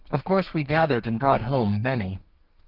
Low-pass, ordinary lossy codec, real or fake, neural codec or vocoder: 5.4 kHz; Opus, 24 kbps; fake; codec, 32 kHz, 1.9 kbps, SNAC